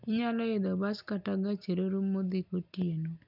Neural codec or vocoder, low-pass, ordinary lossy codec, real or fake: none; 5.4 kHz; none; real